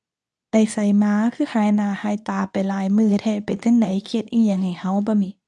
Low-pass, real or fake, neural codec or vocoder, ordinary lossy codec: none; fake; codec, 24 kHz, 0.9 kbps, WavTokenizer, medium speech release version 2; none